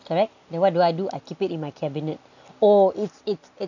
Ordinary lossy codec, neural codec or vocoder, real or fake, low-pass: none; none; real; 7.2 kHz